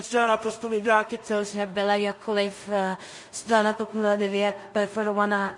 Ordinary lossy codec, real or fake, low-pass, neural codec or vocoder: MP3, 48 kbps; fake; 10.8 kHz; codec, 16 kHz in and 24 kHz out, 0.4 kbps, LongCat-Audio-Codec, two codebook decoder